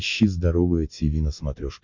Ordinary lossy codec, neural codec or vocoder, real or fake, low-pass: MP3, 64 kbps; none; real; 7.2 kHz